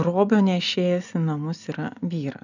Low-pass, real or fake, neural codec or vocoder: 7.2 kHz; real; none